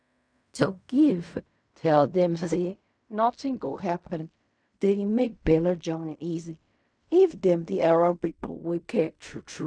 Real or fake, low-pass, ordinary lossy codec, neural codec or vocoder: fake; 9.9 kHz; none; codec, 16 kHz in and 24 kHz out, 0.4 kbps, LongCat-Audio-Codec, fine tuned four codebook decoder